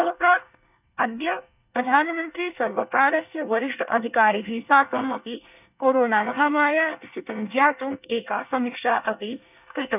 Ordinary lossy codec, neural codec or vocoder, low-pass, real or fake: none; codec, 24 kHz, 1 kbps, SNAC; 3.6 kHz; fake